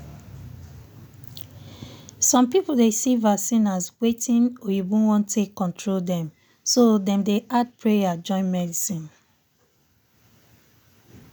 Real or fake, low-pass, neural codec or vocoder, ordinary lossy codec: real; none; none; none